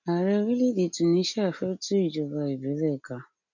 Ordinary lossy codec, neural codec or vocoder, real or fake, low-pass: none; none; real; 7.2 kHz